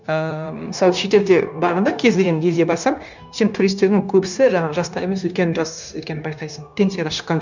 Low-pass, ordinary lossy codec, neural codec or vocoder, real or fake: 7.2 kHz; none; codec, 16 kHz, 0.9 kbps, LongCat-Audio-Codec; fake